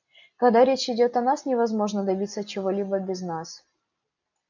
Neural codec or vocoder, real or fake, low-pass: none; real; 7.2 kHz